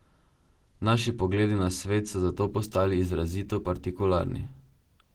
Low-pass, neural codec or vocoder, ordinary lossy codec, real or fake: 19.8 kHz; none; Opus, 16 kbps; real